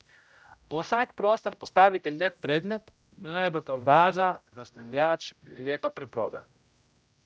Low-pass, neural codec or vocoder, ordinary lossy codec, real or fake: none; codec, 16 kHz, 0.5 kbps, X-Codec, HuBERT features, trained on general audio; none; fake